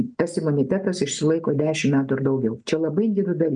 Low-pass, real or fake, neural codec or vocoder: 9.9 kHz; real; none